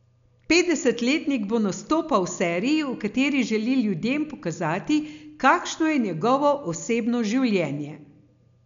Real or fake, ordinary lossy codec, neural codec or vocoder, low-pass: real; none; none; 7.2 kHz